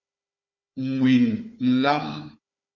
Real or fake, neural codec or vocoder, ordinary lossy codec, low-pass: fake; codec, 16 kHz, 4 kbps, FunCodec, trained on Chinese and English, 50 frames a second; MP3, 64 kbps; 7.2 kHz